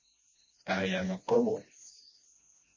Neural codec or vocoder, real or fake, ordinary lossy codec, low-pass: codec, 16 kHz, 1 kbps, FreqCodec, smaller model; fake; MP3, 32 kbps; 7.2 kHz